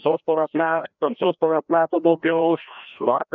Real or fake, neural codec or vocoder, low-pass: fake; codec, 16 kHz, 1 kbps, FreqCodec, larger model; 7.2 kHz